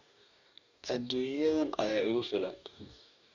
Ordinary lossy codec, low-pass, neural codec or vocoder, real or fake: none; 7.2 kHz; codec, 44.1 kHz, 2.6 kbps, DAC; fake